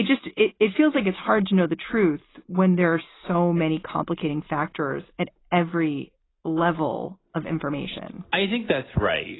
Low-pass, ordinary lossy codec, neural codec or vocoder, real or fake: 7.2 kHz; AAC, 16 kbps; none; real